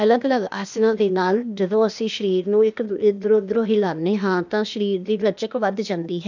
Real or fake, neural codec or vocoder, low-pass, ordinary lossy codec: fake; codec, 16 kHz, 0.8 kbps, ZipCodec; 7.2 kHz; none